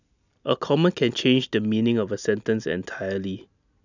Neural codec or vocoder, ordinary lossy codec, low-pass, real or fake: none; none; 7.2 kHz; real